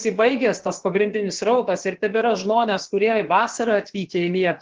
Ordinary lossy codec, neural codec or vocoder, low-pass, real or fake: Opus, 16 kbps; codec, 16 kHz, about 1 kbps, DyCAST, with the encoder's durations; 7.2 kHz; fake